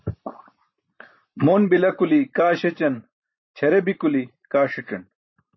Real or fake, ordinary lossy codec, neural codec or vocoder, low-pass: fake; MP3, 24 kbps; vocoder, 44.1 kHz, 128 mel bands every 512 samples, BigVGAN v2; 7.2 kHz